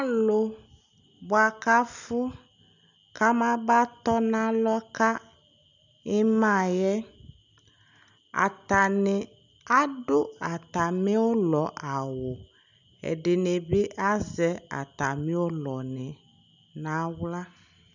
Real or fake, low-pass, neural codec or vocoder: real; 7.2 kHz; none